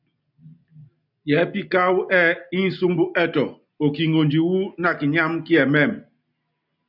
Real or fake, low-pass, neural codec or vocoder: real; 5.4 kHz; none